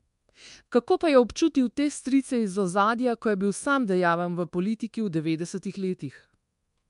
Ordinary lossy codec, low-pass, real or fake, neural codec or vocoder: MP3, 64 kbps; 10.8 kHz; fake; codec, 24 kHz, 1.2 kbps, DualCodec